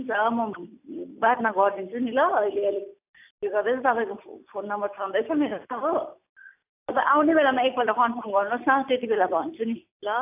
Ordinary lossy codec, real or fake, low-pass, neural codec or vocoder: AAC, 32 kbps; real; 3.6 kHz; none